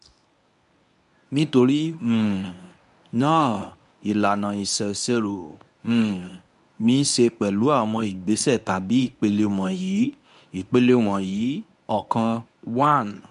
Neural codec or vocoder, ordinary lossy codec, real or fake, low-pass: codec, 24 kHz, 0.9 kbps, WavTokenizer, medium speech release version 1; none; fake; 10.8 kHz